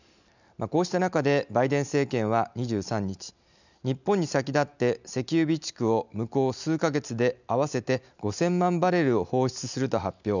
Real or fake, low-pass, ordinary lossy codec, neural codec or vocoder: real; 7.2 kHz; none; none